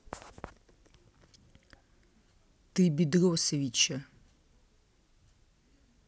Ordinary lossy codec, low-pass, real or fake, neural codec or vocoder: none; none; real; none